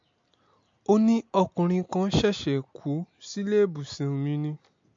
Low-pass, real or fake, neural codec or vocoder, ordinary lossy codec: 7.2 kHz; real; none; MP3, 48 kbps